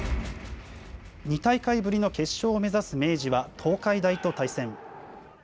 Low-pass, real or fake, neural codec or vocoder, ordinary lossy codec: none; real; none; none